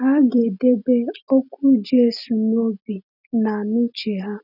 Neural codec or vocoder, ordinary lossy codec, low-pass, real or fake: none; none; 5.4 kHz; real